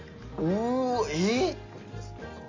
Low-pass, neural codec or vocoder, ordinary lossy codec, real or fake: 7.2 kHz; none; AAC, 32 kbps; real